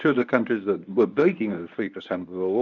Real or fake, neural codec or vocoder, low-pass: fake; codec, 24 kHz, 0.9 kbps, WavTokenizer, medium speech release version 1; 7.2 kHz